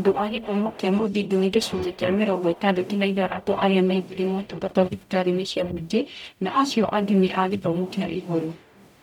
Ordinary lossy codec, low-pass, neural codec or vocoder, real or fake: none; 19.8 kHz; codec, 44.1 kHz, 0.9 kbps, DAC; fake